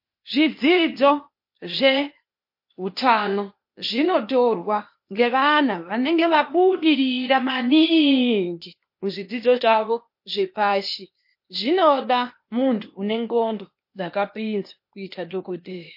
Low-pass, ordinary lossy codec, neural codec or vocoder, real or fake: 5.4 kHz; MP3, 32 kbps; codec, 16 kHz, 0.8 kbps, ZipCodec; fake